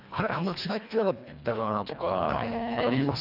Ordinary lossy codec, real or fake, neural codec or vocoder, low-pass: AAC, 48 kbps; fake; codec, 24 kHz, 1.5 kbps, HILCodec; 5.4 kHz